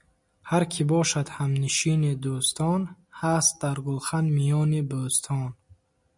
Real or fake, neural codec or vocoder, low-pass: real; none; 10.8 kHz